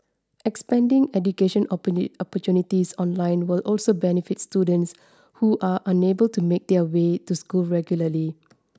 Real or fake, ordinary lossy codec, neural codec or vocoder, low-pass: real; none; none; none